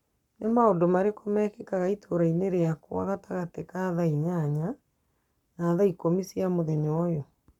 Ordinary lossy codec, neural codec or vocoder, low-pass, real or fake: none; codec, 44.1 kHz, 7.8 kbps, Pupu-Codec; 19.8 kHz; fake